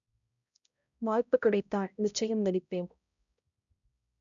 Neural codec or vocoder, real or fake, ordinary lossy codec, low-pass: codec, 16 kHz, 0.5 kbps, X-Codec, HuBERT features, trained on balanced general audio; fake; none; 7.2 kHz